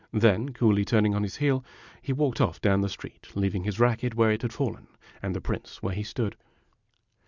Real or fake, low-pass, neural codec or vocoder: real; 7.2 kHz; none